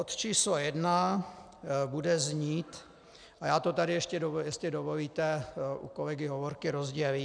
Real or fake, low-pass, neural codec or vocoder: real; 9.9 kHz; none